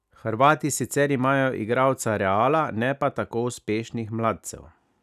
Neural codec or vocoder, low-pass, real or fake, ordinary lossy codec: none; 14.4 kHz; real; none